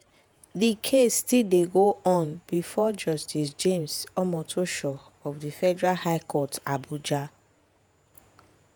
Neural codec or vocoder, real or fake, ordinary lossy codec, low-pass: vocoder, 48 kHz, 128 mel bands, Vocos; fake; none; none